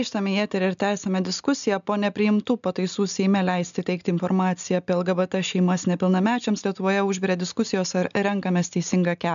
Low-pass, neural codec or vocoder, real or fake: 7.2 kHz; none; real